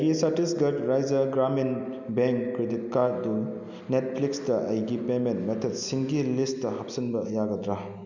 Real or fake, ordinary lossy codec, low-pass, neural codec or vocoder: real; none; 7.2 kHz; none